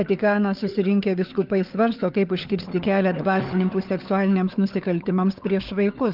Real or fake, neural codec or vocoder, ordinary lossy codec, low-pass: fake; codec, 16 kHz, 4 kbps, FunCodec, trained on LibriTTS, 50 frames a second; Opus, 32 kbps; 5.4 kHz